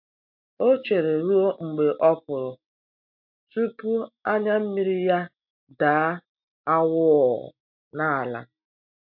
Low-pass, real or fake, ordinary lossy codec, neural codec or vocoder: 5.4 kHz; real; MP3, 48 kbps; none